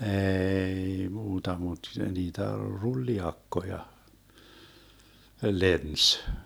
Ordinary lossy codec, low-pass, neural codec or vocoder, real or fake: none; none; none; real